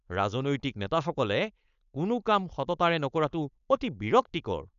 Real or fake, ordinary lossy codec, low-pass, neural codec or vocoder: fake; none; 7.2 kHz; codec, 16 kHz, 4.8 kbps, FACodec